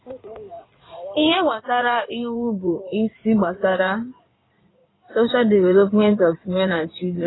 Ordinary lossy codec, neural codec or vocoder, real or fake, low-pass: AAC, 16 kbps; codec, 16 kHz in and 24 kHz out, 2.2 kbps, FireRedTTS-2 codec; fake; 7.2 kHz